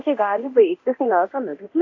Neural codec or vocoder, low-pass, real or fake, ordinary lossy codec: codec, 24 kHz, 0.9 kbps, DualCodec; 7.2 kHz; fake; AAC, 48 kbps